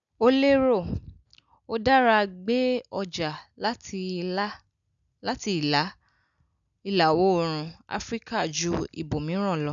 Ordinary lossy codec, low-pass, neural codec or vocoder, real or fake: none; 7.2 kHz; none; real